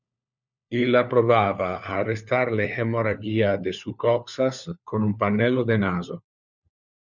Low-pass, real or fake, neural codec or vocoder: 7.2 kHz; fake; codec, 16 kHz, 4 kbps, FunCodec, trained on LibriTTS, 50 frames a second